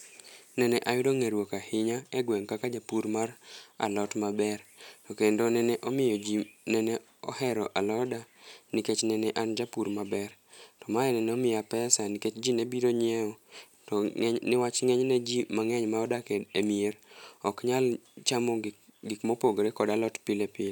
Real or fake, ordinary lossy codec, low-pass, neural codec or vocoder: real; none; none; none